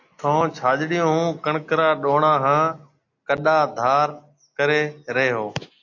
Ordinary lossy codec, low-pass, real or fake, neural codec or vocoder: AAC, 48 kbps; 7.2 kHz; real; none